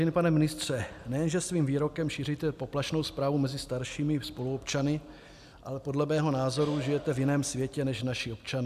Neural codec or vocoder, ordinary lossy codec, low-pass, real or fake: none; AAC, 96 kbps; 14.4 kHz; real